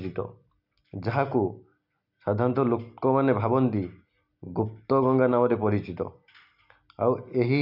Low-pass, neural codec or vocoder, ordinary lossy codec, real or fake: 5.4 kHz; none; none; real